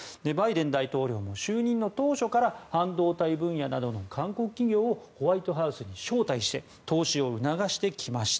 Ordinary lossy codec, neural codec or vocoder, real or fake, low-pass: none; none; real; none